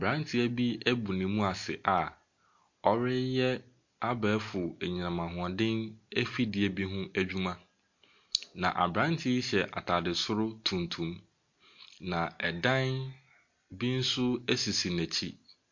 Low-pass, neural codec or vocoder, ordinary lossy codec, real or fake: 7.2 kHz; none; MP3, 48 kbps; real